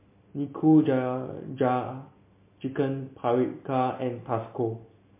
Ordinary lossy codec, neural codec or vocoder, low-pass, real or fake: MP3, 24 kbps; none; 3.6 kHz; real